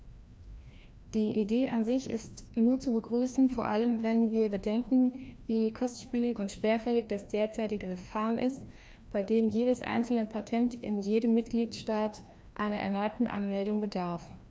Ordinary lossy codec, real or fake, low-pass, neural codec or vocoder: none; fake; none; codec, 16 kHz, 1 kbps, FreqCodec, larger model